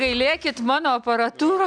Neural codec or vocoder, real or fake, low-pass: none; real; 9.9 kHz